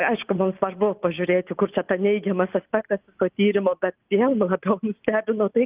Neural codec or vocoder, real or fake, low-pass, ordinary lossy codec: none; real; 3.6 kHz; Opus, 24 kbps